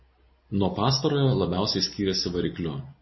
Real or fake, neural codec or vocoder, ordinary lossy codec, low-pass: real; none; MP3, 24 kbps; 7.2 kHz